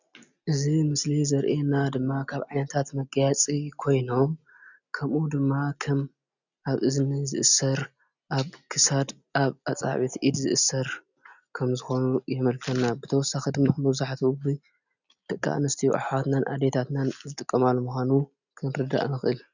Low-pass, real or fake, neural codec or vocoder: 7.2 kHz; real; none